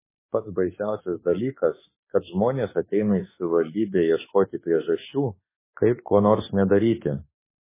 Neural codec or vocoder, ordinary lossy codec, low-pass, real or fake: autoencoder, 48 kHz, 32 numbers a frame, DAC-VAE, trained on Japanese speech; MP3, 16 kbps; 3.6 kHz; fake